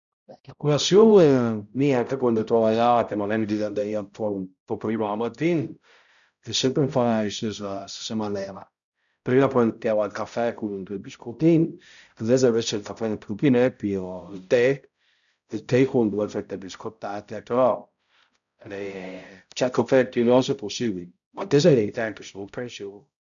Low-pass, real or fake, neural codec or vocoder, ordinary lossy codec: 7.2 kHz; fake; codec, 16 kHz, 0.5 kbps, X-Codec, HuBERT features, trained on balanced general audio; none